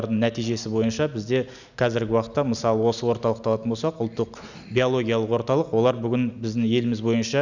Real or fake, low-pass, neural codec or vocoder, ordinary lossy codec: real; 7.2 kHz; none; none